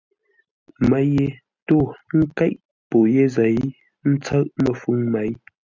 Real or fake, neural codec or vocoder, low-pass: real; none; 7.2 kHz